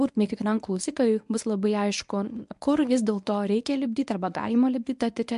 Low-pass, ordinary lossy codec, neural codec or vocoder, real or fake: 10.8 kHz; MP3, 64 kbps; codec, 24 kHz, 0.9 kbps, WavTokenizer, medium speech release version 1; fake